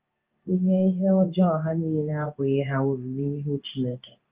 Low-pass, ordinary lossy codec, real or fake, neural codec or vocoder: 3.6 kHz; Opus, 32 kbps; fake; codec, 16 kHz in and 24 kHz out, 1 kbps, XY-Tokenizer